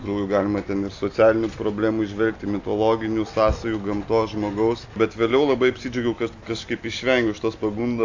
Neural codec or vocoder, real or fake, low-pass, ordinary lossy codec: none; real; 7.2 kHz; AAC, 48 kbps